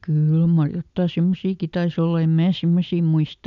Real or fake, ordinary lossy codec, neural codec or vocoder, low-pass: real; none; none; 7.2 kHz